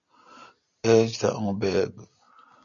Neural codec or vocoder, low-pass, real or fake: none; 7.2 kHz; real